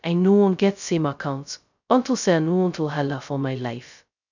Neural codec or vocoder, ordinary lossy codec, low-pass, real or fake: codec, 16 kHz, 0.2 kbps, FocalCodec; none; 7.2 kHz; fake